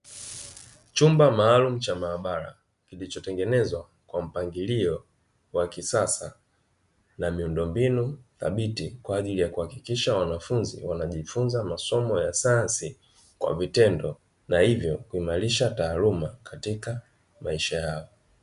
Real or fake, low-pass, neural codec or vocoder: real; 10.8 kHz; none